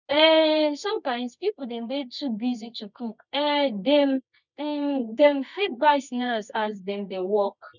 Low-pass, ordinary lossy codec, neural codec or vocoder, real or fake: 7.2 kHz; none; codec, 24 kHz, 0.9 kbps, WavTokenizer, medium music audio release; fake